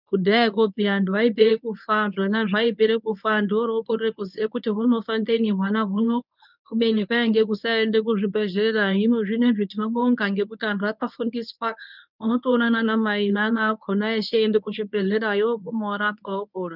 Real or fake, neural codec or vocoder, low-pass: fake; codec, 24 kHz, 0.9 kbps, WavTokenizer, medium speech release version 1; 5.4 kHz